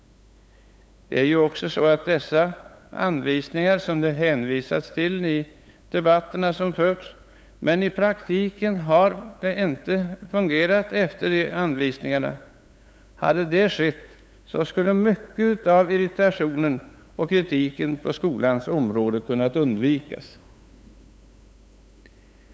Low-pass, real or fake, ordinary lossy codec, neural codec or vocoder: none; fake; none; codec, 16 kHz, 8 kbps, FunCodec, trained on LibriTTS, 25 frames a second